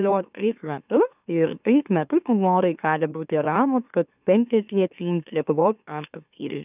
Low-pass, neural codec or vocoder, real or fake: 3.6 kHz; autoencoder, 44.1 kHz, a latent of 192 numbers a frame, MeloTTS; fake